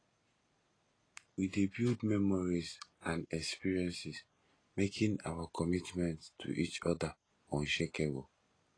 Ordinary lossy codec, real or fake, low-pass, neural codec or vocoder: AAC, 32 kbps; real; 9.9 kHz; none